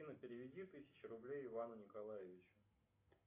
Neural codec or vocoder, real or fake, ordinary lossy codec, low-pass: none; real; AAC, 24 kbps; 3.6 kHz